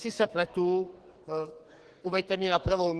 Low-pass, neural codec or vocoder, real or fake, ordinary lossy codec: 10.8 kHz; codec, 44.1 kHz, 2.6 kbps, SNAC; fake; Opus, 16 kbps